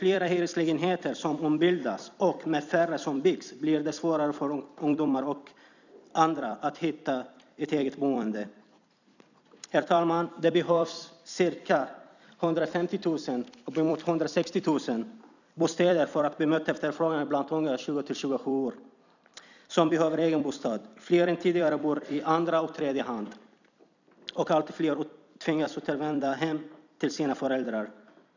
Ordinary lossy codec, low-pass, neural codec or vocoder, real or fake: none; 7.2 kHz; vocoder, 44.1 kHz, 128 mel bands every 256 samples, BigVGAN v2; fake